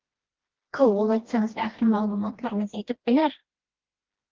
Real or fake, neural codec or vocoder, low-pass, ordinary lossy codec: fake; codec, 16 kHz, 1 kbps, FreqCodec, smaller model; 7.2 kHz; Opus, 16 kbps